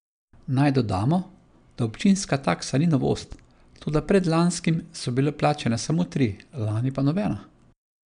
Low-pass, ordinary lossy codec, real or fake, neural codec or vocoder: 9.9 kHz; none; real; none